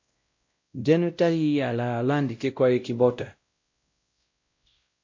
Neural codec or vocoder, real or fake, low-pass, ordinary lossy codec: codec, 16 kHz, 0.5 kbps, X-Codec, WavLM features, trained on Multilingual LibriSpeech; fake; 7.2 kHz; MP3, 48 kbps